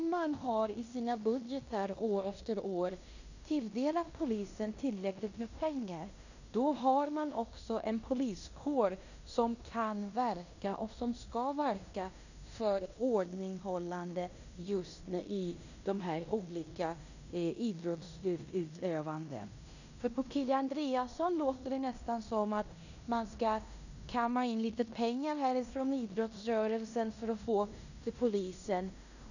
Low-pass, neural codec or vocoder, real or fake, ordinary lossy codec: 7.2 kHz; codec, 16 kHz in and 24 kHz out, 0.9 kbps, LongCat-Audio-Codec, fine tuned four codebook decoder; fake; none